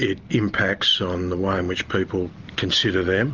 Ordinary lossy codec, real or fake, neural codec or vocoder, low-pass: Opus, 24 kbps; real; none; 7.2 kHz